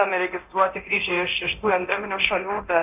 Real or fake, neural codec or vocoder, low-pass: fake; codec, 24 kHz, 0.9 kbps, DualCodec; 3.6 kHz